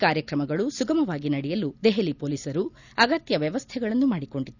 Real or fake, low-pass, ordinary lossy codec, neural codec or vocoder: real; 7.2 kHz; none; none